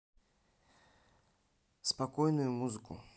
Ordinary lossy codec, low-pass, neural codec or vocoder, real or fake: none; none; none; real